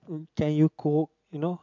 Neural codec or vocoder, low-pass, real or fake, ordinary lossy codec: none; 7.2 kHz; real; MP3, 64 kbps